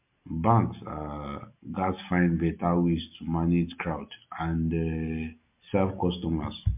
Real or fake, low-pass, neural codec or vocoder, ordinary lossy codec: real; 3.6 kHz; none; MP3, 32 kbps